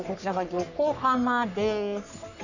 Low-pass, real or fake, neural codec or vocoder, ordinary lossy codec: 7.2 kHz; fake; codec, 44.1 kHz, 3.4 kbps, Pupu-Codec; none